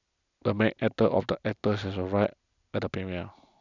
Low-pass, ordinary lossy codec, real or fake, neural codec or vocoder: 7.2 kHz; none; real; none